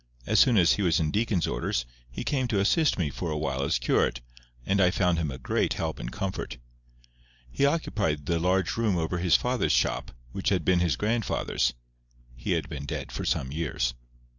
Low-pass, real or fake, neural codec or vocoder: 7.2 kHz; real; none